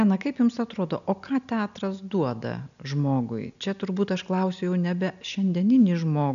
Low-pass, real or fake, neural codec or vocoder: 7.2 kHz; real; none